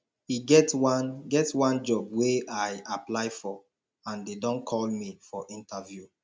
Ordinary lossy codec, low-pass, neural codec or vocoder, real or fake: none; none; none; real